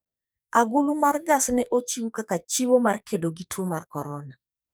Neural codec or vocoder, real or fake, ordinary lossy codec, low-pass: codec, 44.1 kHz, 2.6 kbps, SNAC; fake; none; none